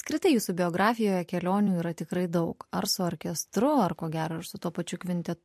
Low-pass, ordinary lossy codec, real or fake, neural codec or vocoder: 14.4 kHz; MP3, 64 kbps; fake; vocoder, 44.1 kHz, 128 mel bands every 256 samples, BigVGAN v2